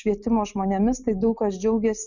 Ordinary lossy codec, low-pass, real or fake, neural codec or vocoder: Opus, 64 kbps; 7.2 kHz; real; none